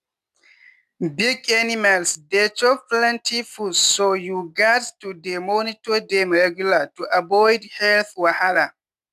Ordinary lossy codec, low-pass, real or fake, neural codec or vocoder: none; 14.4 kHz; real; none